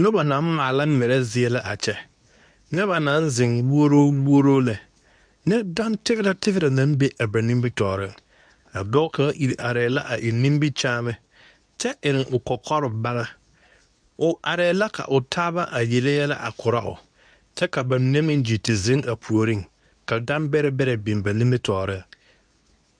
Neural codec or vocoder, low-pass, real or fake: codec, 24 kHz, 0.9 kbps, WavTokenizer, medium speech release version 2; 9.9 kHz; fake